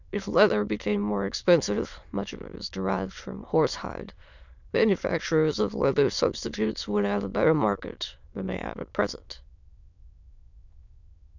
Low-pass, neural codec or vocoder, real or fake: 7.2 kHz; autoencoder, 22.05 kHz, a latent of 192 numbers a frame, VITS, trained on many speakers; fake